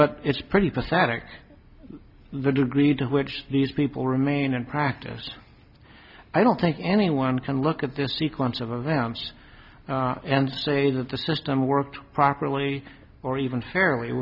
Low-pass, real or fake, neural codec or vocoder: 5.4 kHz; real; none